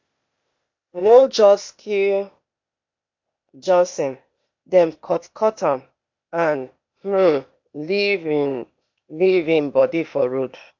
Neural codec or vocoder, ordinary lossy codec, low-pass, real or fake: codec, 16 kHz, 0.8 kbps, ZipCodec; MP3, 48 kbps; 7.2 kHz; fake